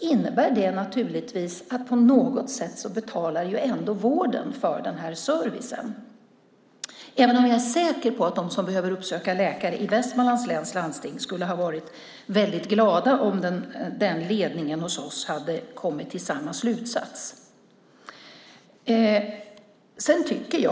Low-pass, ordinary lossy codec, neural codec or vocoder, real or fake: none; none; none; real